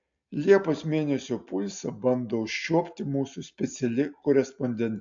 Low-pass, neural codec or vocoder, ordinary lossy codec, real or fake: 7.2 kHz; none; AAC, 64 kbps; real